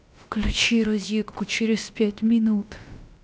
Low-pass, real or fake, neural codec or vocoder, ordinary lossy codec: none; fake; codec, 16 kHz, about 1 kbps, DyCAST, with the encoder's durations; none